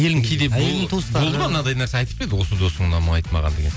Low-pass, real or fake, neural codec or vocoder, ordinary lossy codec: none; real; none; none